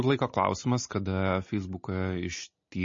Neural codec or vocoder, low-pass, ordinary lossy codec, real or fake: none; 7.2 kHz; MP3, 32 kbps; real